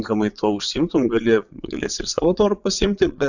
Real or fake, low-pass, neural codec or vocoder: fake; 7.2 kHz; vocoder, 22.05 kHz, 80 mel bands, Vocos